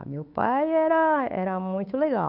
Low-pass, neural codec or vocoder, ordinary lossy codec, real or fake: 5.4 kHz; codec, 16 kHz, 8 kbps, FunCodec, trained on Chinese and English, 25 frames a second; none; fake